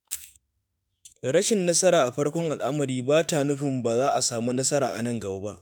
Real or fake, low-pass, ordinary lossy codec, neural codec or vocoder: fake; none; none; autoencoder, 48 kHz, 32 numbers a frame, DAC-VAE, trained on Japanese speech